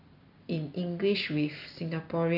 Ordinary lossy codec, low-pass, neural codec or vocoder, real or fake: none; 5.4 kHz; none; real